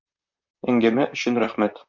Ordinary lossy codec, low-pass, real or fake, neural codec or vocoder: MP3, 64 kbps; 7.2 kHz; fake; vocoder, 44.1 kHz, 128 mel bands, Pupu-Vocoder